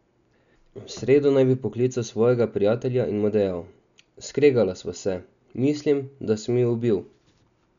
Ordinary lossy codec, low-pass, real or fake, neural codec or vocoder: none; 7.2 kHz; real; none